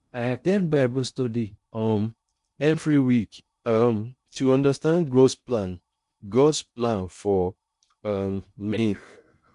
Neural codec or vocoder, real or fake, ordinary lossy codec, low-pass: codec, 16 kHz in and 24 kHz out, 0.6 kbps, FocalCodec, streaming, 2048 codes; fake; MP3, 64 kbps; 10.8 kHz